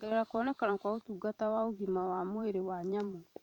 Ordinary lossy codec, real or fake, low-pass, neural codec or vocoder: none; fake; 19.8 kHz; vocoder, 44.1 kHz, 128 mel bands every 512 samples, BigVGAN v2